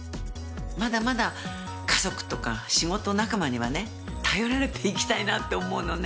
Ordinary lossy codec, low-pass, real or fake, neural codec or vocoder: none; none; real; none